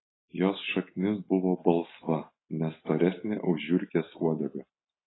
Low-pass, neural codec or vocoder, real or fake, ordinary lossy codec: 7.2 kHz; none; real; AAC, 16 kbps